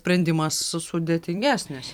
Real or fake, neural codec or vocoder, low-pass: fake; vocoder, 44.1 kHz, 128 mel bands every 256 samples, BigVGAN v2; 19.8 kHz